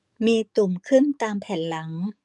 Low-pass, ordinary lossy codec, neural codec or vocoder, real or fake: 10.8 kHz; none; codec, 44.1 kHz, 7.8 kbps, DAC; fake